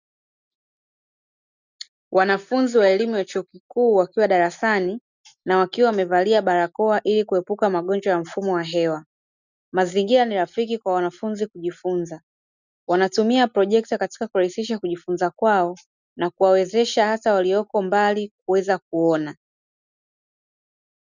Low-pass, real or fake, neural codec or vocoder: 7.2 kHz; real; none